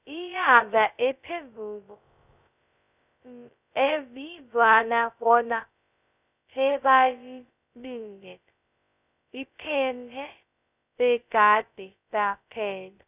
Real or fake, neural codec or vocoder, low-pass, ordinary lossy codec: fake; codec, 16 kHz, 0.2 kbps, FocalCodec; 3.6 kHz; none